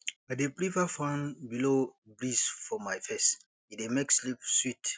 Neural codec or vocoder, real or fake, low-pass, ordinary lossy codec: none; real; none; none